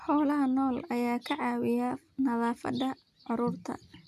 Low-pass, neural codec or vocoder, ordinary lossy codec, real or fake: 14.4 kHz; none; AAC, 96 kbps; real